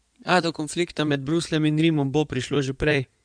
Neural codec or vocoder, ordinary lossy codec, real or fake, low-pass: codec, 16 kHz in and 24 kHz out, 2.2 kbps, FireRedTTS-2 codec; none; fake; 9.9 kHz